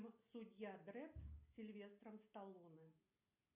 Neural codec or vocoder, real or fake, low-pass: none; real; 3.6 kHz